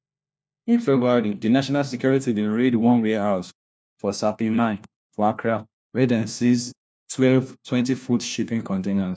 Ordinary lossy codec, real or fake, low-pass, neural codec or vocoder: none; fake; none; codec, 16 kHz, 1 kbps, FunCodec, trained on LibriTTS, 50 frames a second